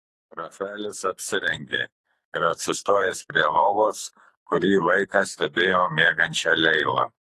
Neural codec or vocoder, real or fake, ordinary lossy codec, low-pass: codec, 44.1 kHz, 2.6 kbps, SNAC; fake; AAC, 48 kbps; 14.4 kHz